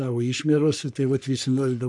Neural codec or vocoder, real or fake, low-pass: codec, 44.1 kHz, 3.4 kbps, Pupu-Codec; fake; 10.8 kHz